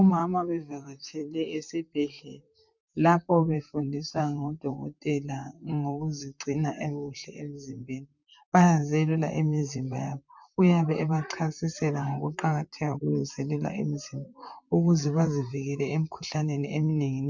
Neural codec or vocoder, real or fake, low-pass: vocoder, 44.1 kHz, 128 mel bands, Pupu-Vocoder; fake; 7.2 kHz